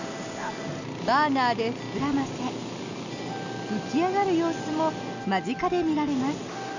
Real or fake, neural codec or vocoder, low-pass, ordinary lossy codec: real; none; 7.2 kHz; none